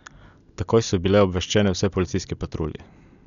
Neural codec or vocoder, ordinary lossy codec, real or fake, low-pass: none; none; real; 7.2 kHz